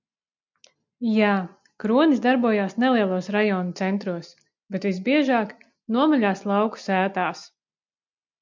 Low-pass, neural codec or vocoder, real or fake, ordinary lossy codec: 7.2 kHz; none; real; MP3, 64 kbps